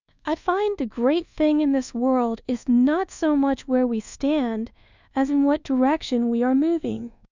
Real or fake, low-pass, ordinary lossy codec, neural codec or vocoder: fake; 7.2 kHz; Opus, 64 kbps; codec, 24 kHz, 1.2 kbps, DualCodec